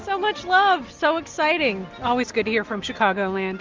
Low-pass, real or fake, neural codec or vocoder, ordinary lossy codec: 7.2 kHz; real; none; Opus, 32 kbps